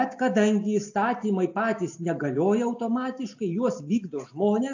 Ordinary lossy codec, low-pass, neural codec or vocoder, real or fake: AAC, 48 kbps; 7.2 kHz; none; real